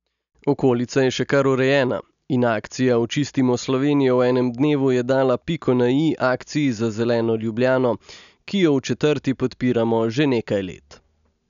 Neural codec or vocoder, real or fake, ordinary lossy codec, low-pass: none; real; none; 7.2 kHz